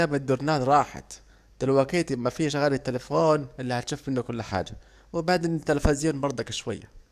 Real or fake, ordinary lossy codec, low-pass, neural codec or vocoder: fake; none; 14.4 kHz; codec, 44.1 kHz, 7.8 kbps, DAC